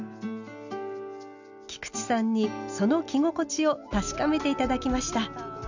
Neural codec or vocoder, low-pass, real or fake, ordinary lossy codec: none; 7.2 kHz; real; none